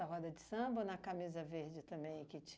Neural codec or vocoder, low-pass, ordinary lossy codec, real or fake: none; none; none; real